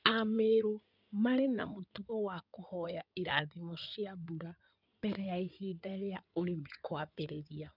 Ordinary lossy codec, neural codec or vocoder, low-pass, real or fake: none; codec, 16 kHz in and 24 kHz out, 2.2 kbps, FireRedTTS-2 codec; 5.4 kHz; fake